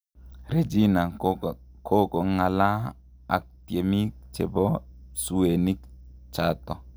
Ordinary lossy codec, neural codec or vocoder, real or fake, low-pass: none; none; real; none